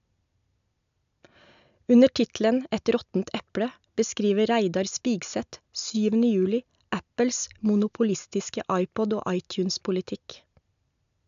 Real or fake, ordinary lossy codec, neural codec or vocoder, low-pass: real; AAC, 96 kbps; none; 7.2 kHz